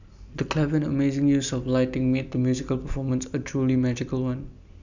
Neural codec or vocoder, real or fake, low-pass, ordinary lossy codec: none; real; 7.2 kHz; none